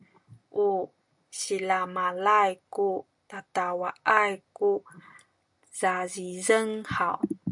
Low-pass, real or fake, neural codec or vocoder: 10.8 kHz; real; none